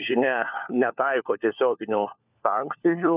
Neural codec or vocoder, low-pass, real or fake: codec, 16 kHz, 4 kbps, FunCodec, trained on LibriTTS, 50 frames a second; 3.6 kHz; fake